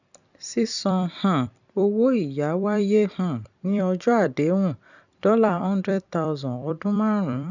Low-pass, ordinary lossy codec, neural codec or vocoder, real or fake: 7.2 kHz; none; vocoder, 44.1 kHz, 128 mel bands every 256 samples, BigVGAN v2; fake